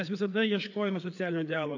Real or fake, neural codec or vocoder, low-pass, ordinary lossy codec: fake; codec, 16 kHz, 4 kbps, FreqCodec, larger model; 7.2 kHz; AAC, 48 kbps